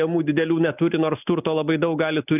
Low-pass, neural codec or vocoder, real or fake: 3.6 kHz; none; real